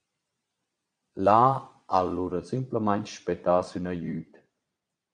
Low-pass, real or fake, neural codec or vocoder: 9.9 kHz; fake; vocoder, 22.05 kHz, 80 mel bands, Vocos